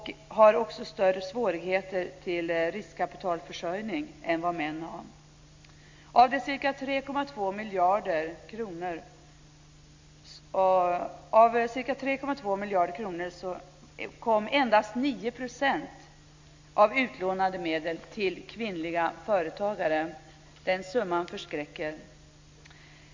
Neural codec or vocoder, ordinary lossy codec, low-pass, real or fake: none; MP3, 48 kbps; 7.2 kHz; real